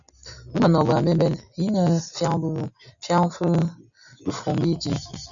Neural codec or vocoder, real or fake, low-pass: none; real; 7.2 kHz